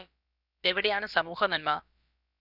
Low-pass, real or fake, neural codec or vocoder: 5.4 kHz; fake; codec, 16 kHz, about 1 kbps, DyCAST, with the encoder's durations